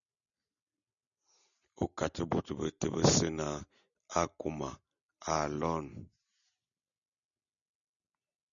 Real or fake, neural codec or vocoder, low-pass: real; none; 7.2 kHz